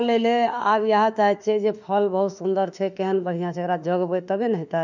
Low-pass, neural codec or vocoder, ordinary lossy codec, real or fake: 7.2 kHz; autoencoder, 48 kHz, 32 numbers a frame, DAC-VAE, trained on Japanese speech; none; fake